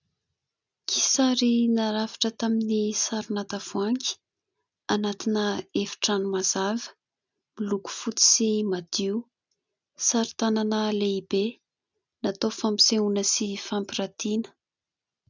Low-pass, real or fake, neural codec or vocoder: 7.2 kHz; real; none